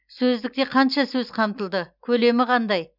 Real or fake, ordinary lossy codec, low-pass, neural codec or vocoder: real; none; 5.4 kHz; none